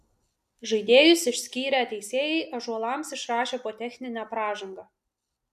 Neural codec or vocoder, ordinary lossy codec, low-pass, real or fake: none; AAC, 96 kbps; 14.4 kHz; real